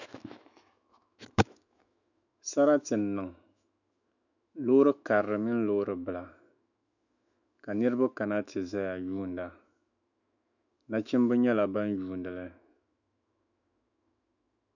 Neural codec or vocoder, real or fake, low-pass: autoencoder, 48 kHz, 128 numbers a frame, DAC-VAE, trained on Japanese speech; fake; 7.2 kHz